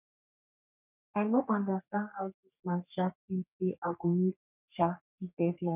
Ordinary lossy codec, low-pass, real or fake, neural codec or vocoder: none; 3.6 kHz; fake; codec, 44.1 kHz, 3.4 kbps, Pupu-Codec